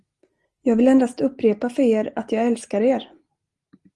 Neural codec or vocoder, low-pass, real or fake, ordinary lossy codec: none; 10.8 kHz; real; Opus, 24 kbps